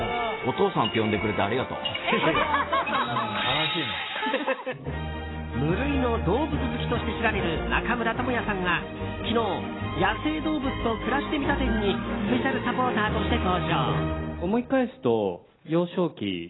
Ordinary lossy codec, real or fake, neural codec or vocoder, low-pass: AAC, 16 kbps; real; none; 7.2 kHz